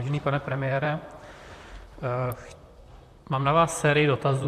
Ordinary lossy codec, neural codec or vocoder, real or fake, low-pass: MP3, 96 kbps; vocoder, 44.1 kHz, 128 mel bands, Pupu-Vocoder; fake; 14.4 kHz